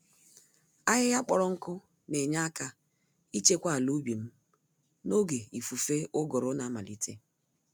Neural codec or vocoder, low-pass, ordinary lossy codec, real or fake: none; none; none; real